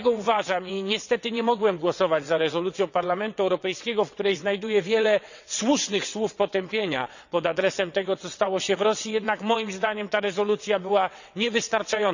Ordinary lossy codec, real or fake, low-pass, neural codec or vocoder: none; fake; 7.2 kHz; vocoder, 22.05 kHz, 80 mel bands, WaveNeXt